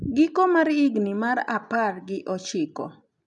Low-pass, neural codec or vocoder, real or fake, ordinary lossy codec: 10.8 kHz; none; real; none